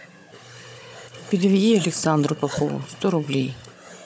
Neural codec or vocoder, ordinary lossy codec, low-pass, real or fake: codec, 16 kHz, 16 kbps, FunCodec, trained on Chinese and English, 50 frames a second; none; none; fake